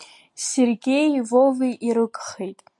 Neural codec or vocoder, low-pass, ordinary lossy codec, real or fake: none; 10.8 kHz; MP3, 64 kbps; real